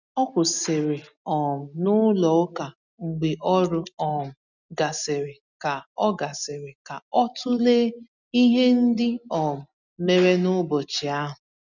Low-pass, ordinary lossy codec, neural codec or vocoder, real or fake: 7.2 kHz; none; none; real